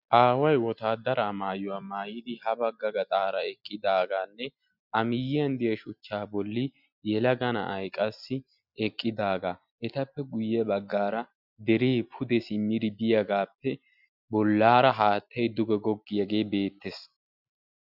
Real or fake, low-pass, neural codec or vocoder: real; 5.4 kHz; none